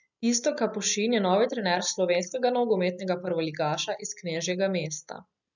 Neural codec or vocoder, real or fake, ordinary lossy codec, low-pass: none; real; none; 7.2 kHz